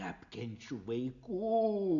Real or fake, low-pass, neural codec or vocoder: real; 7.2 kHz; none